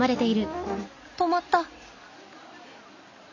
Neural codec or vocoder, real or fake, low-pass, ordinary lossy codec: none; real; 7.2 kHz; none